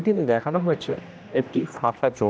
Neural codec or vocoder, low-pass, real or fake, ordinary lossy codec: codec, 16 kHz, 1 kbps, X-Codec, HuBERT features, trained on general audio; none; fake; none